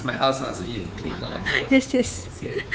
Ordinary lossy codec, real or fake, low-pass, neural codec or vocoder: none; fake; none; codec, 16 kHz, 4 kbps, X-Codec, WavLM features, trained on Multilingual LibriSpeech